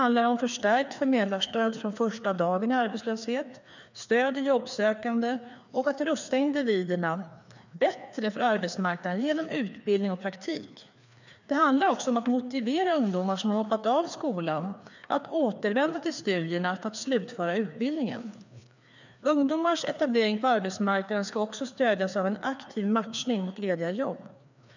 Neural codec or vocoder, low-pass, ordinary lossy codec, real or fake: codec, 16 kHz, 2 kbps, FreqCodec, larger model; 7.2 kHz; none; fake